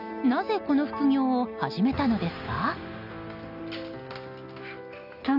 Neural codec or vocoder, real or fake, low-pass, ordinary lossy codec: none; real; 5.4 kHz; none